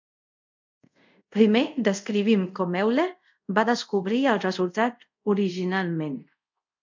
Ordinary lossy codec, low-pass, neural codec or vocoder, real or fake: MP3, 64 kbps; 7.2 kHz; codec, 24 kHz, 0.5 kbps, DualCodec; fake